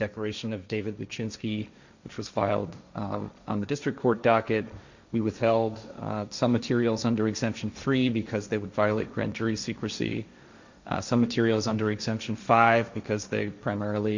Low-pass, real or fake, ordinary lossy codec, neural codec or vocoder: 7.2 kHz; fake; Opus, 64 kbps; codec, 16 kHz, 1.1 kbps, Voila-Tokenizer